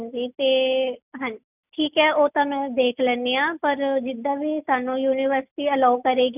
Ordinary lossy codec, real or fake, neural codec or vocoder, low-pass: none; real; none; 3.6 kHz